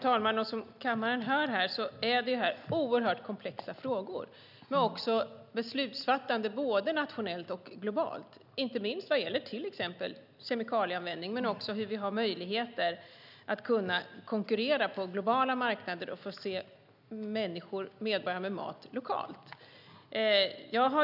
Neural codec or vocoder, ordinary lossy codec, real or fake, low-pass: none; none; real; 5.4 kHz